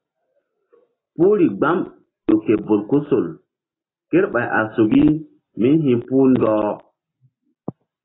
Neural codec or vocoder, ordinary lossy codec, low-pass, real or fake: none; AAC, 16 kbps; 7.2 kHz; real